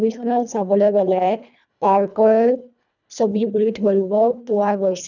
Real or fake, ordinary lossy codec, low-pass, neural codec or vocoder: fake; none; 7.2 kHz; codec, 24 kHz, 1.5 kbps, HILCodec